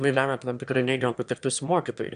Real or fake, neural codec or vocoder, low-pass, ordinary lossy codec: fake; autoencoder, 22.05 kHz, a latent of 192 numbers a frame, VITS, trained on one speaker; 9.9 kHz; AAC, 96 kbps